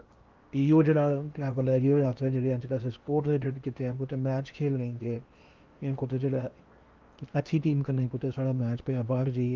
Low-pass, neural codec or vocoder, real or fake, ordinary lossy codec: 7.2 kHz; codec, 16 kHz in and 24 kHz out, 0.8 kbps, FocalCodec, streaming, 65536 codes; fake; Opus, 32 kbps